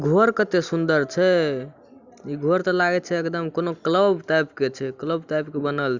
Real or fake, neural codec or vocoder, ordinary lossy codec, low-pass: real; none; Opus, 64 kbps; 7.2 kHz